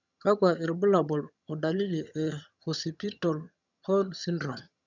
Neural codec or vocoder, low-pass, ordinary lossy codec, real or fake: vocoder, 22.05 kHz, 80 mel bands, HiFi-GAN; 7.2 kHz; none; fake